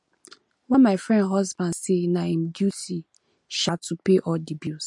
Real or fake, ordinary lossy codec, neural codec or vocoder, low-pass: real; MP3, 48 kbps; none; 10.8 kHz